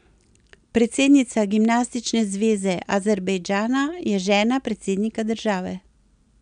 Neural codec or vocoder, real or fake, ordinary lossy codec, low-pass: none; real; none; 9.9 kHz